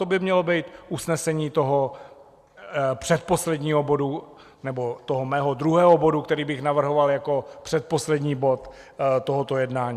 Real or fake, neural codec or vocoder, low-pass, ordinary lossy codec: real; none; 14.4 kHz; Opus, 64 kbps